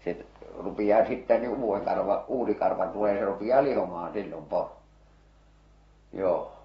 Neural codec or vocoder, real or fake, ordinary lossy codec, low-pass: none; real; AAC, 24 kbps; 19.8 kHz